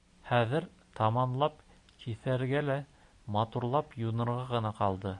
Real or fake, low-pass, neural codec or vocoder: real; 10.8 kHz; none